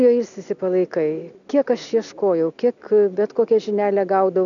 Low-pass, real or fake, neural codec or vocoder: 7.2 kHz; real; none